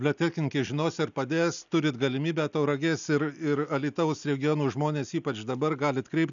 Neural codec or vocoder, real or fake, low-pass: none; real; 7.2 kHz